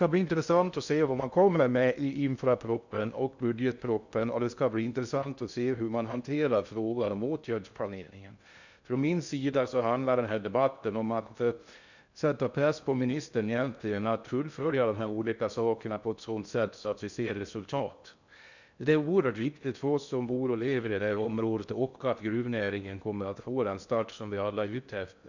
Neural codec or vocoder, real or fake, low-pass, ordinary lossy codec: codec, 16 kHz in and 24 kHz out, 0.6 kbps, FocalCodec, streaming, 2048 codes; fake; 7.2 kHz; none